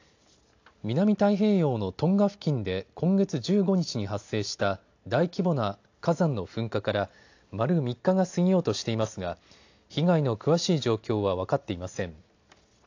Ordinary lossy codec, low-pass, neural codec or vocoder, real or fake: AAC, 48 kbps; 7.2 kHz; none; real